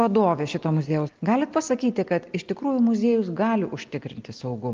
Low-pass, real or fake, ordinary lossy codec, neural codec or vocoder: 7.2 kHz; real; Opus, 32 kbps; none